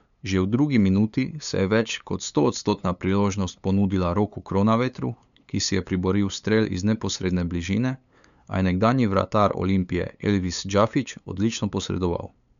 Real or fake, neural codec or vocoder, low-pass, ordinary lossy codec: fake; codec, 16 kHz, 8 kbps, FunCodec, trained on LibriTTS, 25 frames a second; 7.2 kHz; none